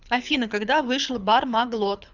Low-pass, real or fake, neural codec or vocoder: 7.2 kHz; fake; codec, 24 kHz, 6 kbps, HILCodec